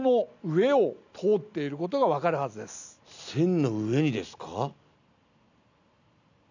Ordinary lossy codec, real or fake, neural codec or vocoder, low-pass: none; real; none; 7.2 kHz